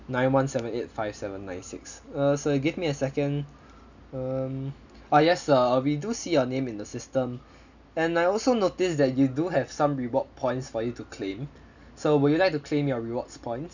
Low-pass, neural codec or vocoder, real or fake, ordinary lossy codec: 7.2 kHz; none; real; none